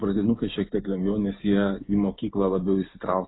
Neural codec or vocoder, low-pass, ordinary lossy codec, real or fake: none; 7.2 kHz; AAC, 16 kbps; real